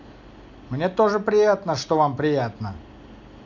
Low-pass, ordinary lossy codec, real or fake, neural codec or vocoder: 7.2 kHz; none; real; none